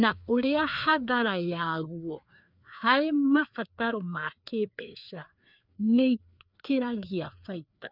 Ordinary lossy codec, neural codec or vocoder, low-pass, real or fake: none; codec, 16 kHz, 2 kbps, FreqCodec, larger model; 5.4 kHz; fake